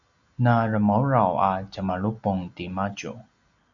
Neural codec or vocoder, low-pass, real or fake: none; 7.2 kHz; real